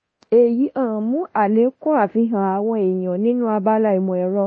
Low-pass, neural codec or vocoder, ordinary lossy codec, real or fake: 9.9 kHz; codec, 24 kHz, 0.9 kbps, DualCodec; MP3, 32 kbps; fake